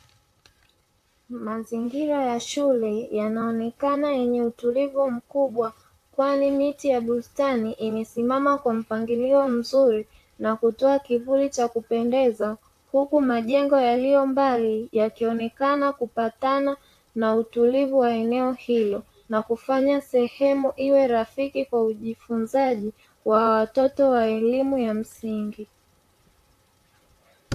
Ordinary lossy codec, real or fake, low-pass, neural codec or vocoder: AAC, 64 kbps; fake; 14.4 kHz; vocoder, 44.1 kHz, 128 mel bands, Pupu-Vocoder